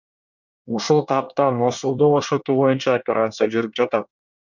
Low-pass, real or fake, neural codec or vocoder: 7.2 kHz; fake; codec, 24 kHz, 1 kbps, SNAC